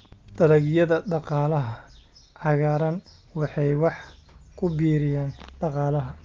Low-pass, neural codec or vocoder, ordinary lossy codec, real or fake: 7.2 kHz; none; Opus, 32 kbps; real